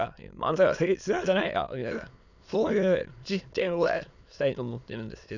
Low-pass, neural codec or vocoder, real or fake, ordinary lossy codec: 7.2 kHz; autoencoder, 22.05 kHz, a latent of 192 numbers a frame, VITS, trained on many speakers; fake; none